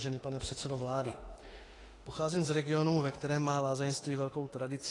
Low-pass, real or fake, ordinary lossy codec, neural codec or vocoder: 10.8 kHz; fake; AAC, 32 kbps; autoencoder, 48 kHz, 32 numbers a frame, DAC-VAE, trained on Japanese speech